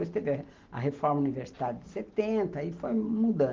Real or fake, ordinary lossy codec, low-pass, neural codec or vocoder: real; Opus, 16 kbps; 7.2 kHz; none